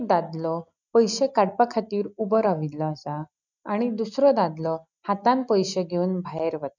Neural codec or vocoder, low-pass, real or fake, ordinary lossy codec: none; 7.2 kHz; real; none